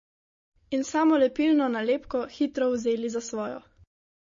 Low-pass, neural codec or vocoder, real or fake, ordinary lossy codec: 7.2 kHz; none; real; MP3, 32 kbps